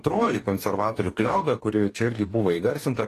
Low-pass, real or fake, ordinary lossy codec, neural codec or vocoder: 14.4 kHz; fake; AAC, 48 kbps; codec, 44.1 kHz, 2.6 kbps, DAC